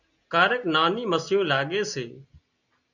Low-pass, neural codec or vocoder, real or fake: 7.2 kHz; none; real